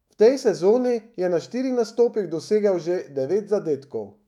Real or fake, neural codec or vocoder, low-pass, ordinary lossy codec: fake; autoencoder, 48 kHz, 128 numbers a frame, DAC-VAE, trained on Japanese speech; 19.8 kHz; none